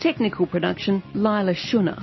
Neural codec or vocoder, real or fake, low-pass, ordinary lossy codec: none; real; 7.2 kHz; MP3, 24 kbps